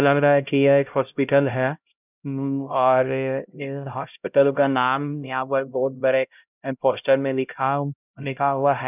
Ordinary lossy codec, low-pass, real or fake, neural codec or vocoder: none; 3.6 kHz; fake; codec, 16 kHz, 0.5 kbps, X-Codec, HuBERT features, trained on LibriSpeech